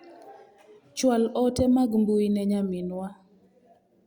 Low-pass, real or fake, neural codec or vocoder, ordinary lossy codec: 19.8 kHz; real; none; Opus, 64 kbps